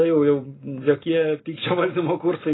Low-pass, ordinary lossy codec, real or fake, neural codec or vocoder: 7.2 kHz; AAC, 16 kbps; real; none